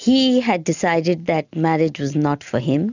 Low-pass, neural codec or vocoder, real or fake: 7.2 kHz; none; real